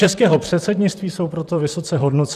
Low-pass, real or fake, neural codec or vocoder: 14.4 kHz; fake; vocoder, 44.1 kHz, 128 mel bands, Pupu-Vocoder